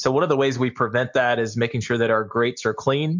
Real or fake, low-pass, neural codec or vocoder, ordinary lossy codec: real; 7.2 kHz; none; MP3, 64 kbps